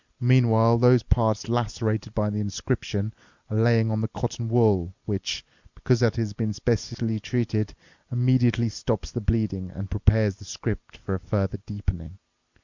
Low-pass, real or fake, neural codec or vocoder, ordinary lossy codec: 7.2 kHz; real; none; Opus, 64 kbps